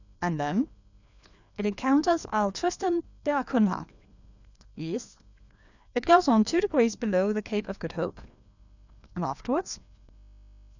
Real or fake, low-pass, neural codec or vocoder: fake; 7.2 kHz; codec, 16 kHz, 2 kbps, FreqCodec, larger model